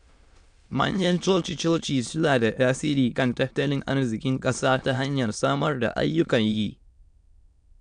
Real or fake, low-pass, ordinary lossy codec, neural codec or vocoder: fake; 9.9 kHz; none; autoencoder, 22.05 kHz, a latent of 192 numbers a frame, VITS, trained on many speakers